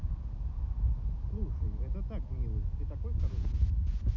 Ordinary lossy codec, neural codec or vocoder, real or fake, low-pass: MP3, 64 kbps; none; real; 7.2 kHz